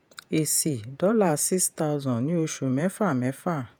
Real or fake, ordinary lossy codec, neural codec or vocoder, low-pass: fake; none; vocoder, 48 kHz, 128 mel bands, Vocos; none